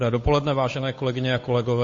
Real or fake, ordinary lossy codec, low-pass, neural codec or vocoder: fake; MP3, 32 kbps; 7.2 kHz; codec, 16 kHz, 8 kbps, FunCodec, trained on Chinese and English, 25 frames a second